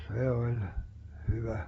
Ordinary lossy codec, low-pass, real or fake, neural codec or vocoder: AAC, 24 kbps; 19.8 kHz; real; none